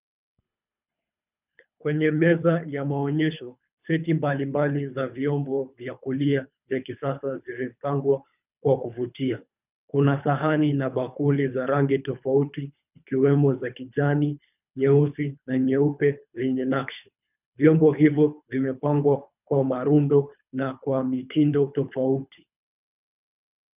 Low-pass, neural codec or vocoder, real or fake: 3.6 kHz; codec, 24 kHz, 3 kbps, HILCodec; fake